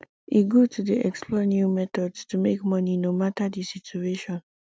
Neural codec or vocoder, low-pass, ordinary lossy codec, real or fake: none; none; none; real